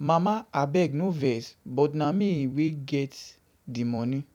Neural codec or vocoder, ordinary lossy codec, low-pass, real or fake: vocoder, 44.1 kHz, 128 mel bands every 256 samples, BigVGAN v2; none; 19.8 kHz; fake